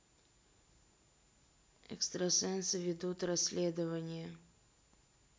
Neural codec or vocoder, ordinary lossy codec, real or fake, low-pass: none; none; real; none